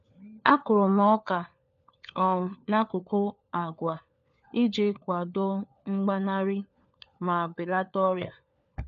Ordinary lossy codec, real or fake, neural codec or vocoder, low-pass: none; fake; codec, 16 kHz, 4 kbps, FunCodec, trained on LibriTTS, 50 frames a second; 7.2 kHz